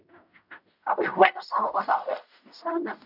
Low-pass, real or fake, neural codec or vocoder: 5.4 kHz; fake; codec, 16 kHz in and 24 kHz out, 0.4 kbps, LongCat-Audio-Codec, fine tuned four codebook decoder